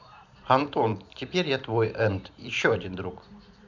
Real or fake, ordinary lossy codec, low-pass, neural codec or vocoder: fake; none; 7.2 kHz; vocoder, 44.1 kHz, 128 mel bands every 512 samples, BigVGAN v2